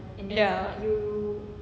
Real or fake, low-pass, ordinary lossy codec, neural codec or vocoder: real; none; none; none